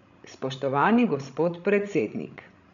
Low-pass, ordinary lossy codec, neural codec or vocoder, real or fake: 7.2 kHz; none; codec, 16 kHz, 16 kbps, FreqCodec, larger model; fake